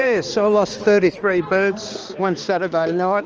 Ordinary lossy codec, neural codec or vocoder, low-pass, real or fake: Opus, 24 kbps; codec, 16 kHz, 2 kbps, X-Codec, HuBERT features, trained on balanced general audio; 7.2 kHz; fake